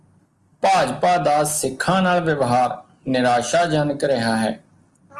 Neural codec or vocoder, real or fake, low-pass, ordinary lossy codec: none; real; 10.8 kHz; Opus, 24 kbps